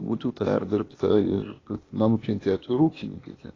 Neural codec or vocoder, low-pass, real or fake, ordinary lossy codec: codec, 16 kHz, 0.8 kbps, ZipCodec; 7.2 kHz; fake; AAC, 32 kbps